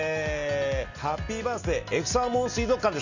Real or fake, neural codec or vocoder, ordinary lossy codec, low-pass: real; none; none; 7.2 kHz